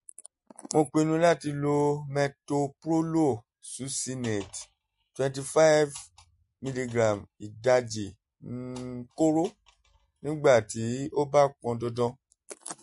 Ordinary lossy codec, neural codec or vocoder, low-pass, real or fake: MP3, 48 kbps; none; 14.4 kHz; real